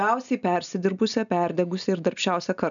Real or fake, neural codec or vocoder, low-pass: real; none; 7.2 kHz